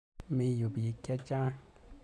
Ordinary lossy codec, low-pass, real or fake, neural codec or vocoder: none; none; real; none